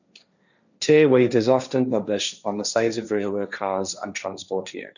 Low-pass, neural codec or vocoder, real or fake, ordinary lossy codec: 7.2 kHz; codec, 16 kHz, 1.1 kbps, Voila-Tokenizer; fake; none